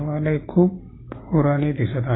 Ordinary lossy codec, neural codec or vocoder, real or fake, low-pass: AAC, 16 kbps; none; real; 7.2 kHz